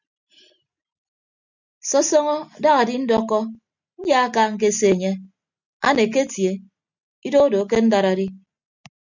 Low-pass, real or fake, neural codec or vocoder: 7.2 kHz; real; none